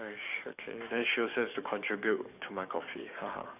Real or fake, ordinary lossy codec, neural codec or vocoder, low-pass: fake; none; codec, 44.1 kHz, 7.8 kbps, DAC; 3.6 kHz